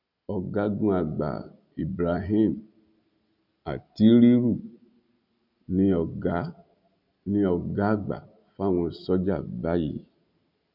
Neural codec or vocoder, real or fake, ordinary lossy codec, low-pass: none; real; none; 5.4 kHz